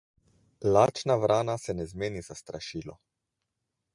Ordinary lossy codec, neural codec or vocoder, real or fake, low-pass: MP3, 96 kbps; none; real; 10.8 kHz